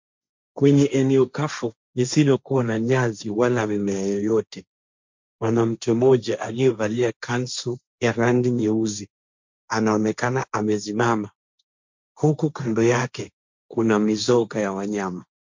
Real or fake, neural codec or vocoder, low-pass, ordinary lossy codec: fake; codec, 16 kHz, 1.1 kbps, Voila-Tokenizer; 7.2 kHz; MP3, 64 kbps